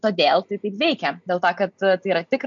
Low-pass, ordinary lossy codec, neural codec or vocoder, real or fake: 7.2 kHz; MP3, 64 kbps; none; real